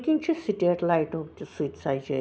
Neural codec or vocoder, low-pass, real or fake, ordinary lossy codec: none; none; real; none